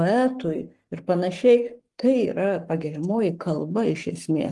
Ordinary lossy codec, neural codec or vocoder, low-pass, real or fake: Opus, 24 kbps; codec, 44.1 kHz, 7.8 kbps, Pupu-Codec; 10.8 kHz; fake